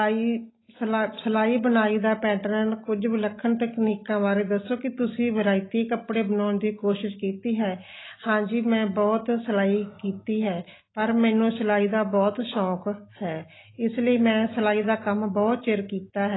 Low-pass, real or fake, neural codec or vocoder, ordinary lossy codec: 7.2 kHz; real; none; AAC, 16 kbps